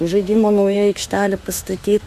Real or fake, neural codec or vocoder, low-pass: fake; autoencoder, 48 kHz, 32 numbers a frame, DAC-VAE, trained on Japanese speech; 14.4 kHz